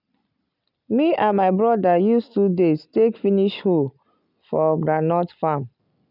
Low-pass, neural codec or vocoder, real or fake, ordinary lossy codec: 5.4 kHz; none; real; none